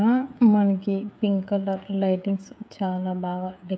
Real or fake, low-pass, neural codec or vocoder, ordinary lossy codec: fake; none; codec, 16 kHz, 16 kbps, FreqCodec, smaller model; none